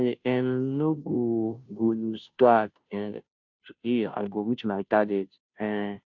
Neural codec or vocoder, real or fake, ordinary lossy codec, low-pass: codec, 16 kHz, 0.5 kbps, FunCodec, trained on Chinese and English, 25 frames a second; fake; none; 7.2 kHz